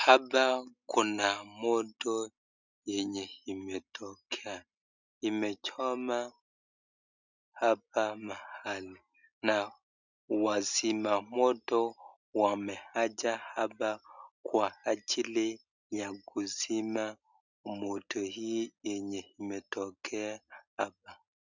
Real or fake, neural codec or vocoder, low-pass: real; none; 7.2 kHz